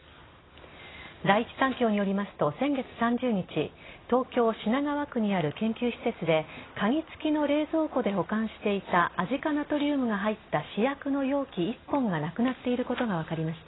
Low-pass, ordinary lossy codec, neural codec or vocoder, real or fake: 7.2 kHz; AAC, 16 kbps; none; real